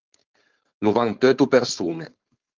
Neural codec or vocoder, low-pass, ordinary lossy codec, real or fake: codec, 16 kHz, 4.8 kbps, FACodec; 7.2 kHz; Opus, 24 kbps; fake